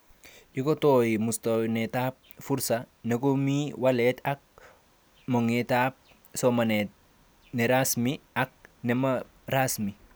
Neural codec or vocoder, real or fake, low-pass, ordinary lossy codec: none; real; none; none